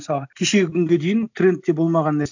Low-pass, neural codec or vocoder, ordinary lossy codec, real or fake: 7.2 kHz; none; none; real